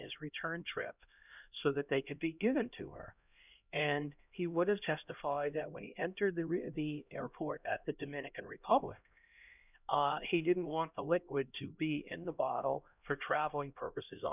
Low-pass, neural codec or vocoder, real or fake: 3.6 kHz; codec, 16 kHz, 1 kbps, X-Codec, HuBERT features, trained on LibriSpeech; fake